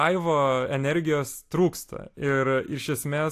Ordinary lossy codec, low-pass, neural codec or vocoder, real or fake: AAC, 64 kbps; 14.4 kHz; none; real